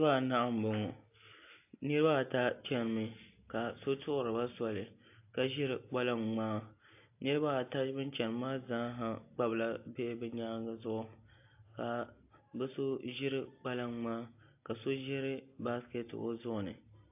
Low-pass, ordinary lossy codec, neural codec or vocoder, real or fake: 3.6 kHz; AAC, 32 kbps; none; real